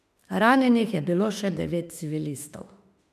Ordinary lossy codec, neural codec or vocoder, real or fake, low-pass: MP3, 96 kbps; autoencoder, 48 kHz, 32 numbers a frame, DAC-VAE, trained on Japanese speech; fake; 14.4 kHz